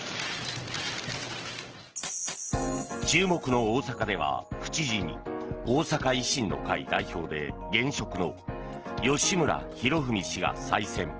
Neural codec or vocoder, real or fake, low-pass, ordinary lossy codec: none; real; 7.2 kHz; Opus, 16 kbps